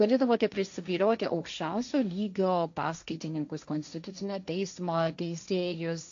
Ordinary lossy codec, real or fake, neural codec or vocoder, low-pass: AAC, 64 kbps; fake; codec, 16 kHz, 1.1 kbps, Voila-Tokenizer; 7.2 kHz